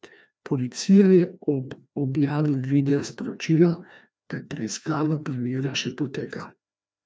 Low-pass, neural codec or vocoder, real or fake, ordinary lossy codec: none; codec, 16 kHz, 1 kbps, FreqCodec, larger model; fake; none